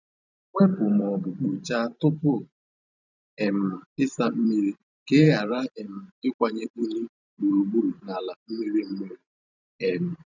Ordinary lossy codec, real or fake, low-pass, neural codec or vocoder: none; fake; 7.2 kHz; vocoder, 44.1 kHz, 128 mel bands every 512 samples, BigVGAN v2